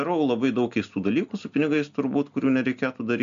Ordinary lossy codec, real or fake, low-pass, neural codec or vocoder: MP3, 64 kbps; real; 7.2 kHz; none